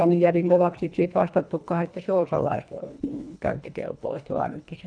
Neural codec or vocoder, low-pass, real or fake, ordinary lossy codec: codec, 24 kHz, 1.5 kbps, HILCodec; 9.9 kHz; fake; none